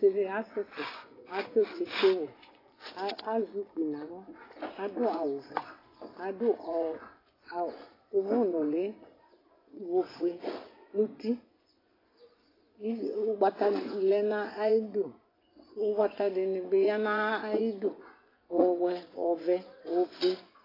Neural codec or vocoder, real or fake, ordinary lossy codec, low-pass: vocoder, 24 kHz, 100 mel bands, Vocos; fake; AAC, 24 kbps; 5.4 kHz